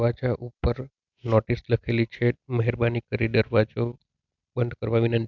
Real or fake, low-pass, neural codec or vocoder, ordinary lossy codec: real; 7.2 kHz; none; none